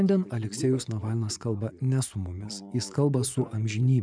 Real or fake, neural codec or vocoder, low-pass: fake; vocoder, 24 kHz, 100 mel bands, Vocos; 9.9 kHz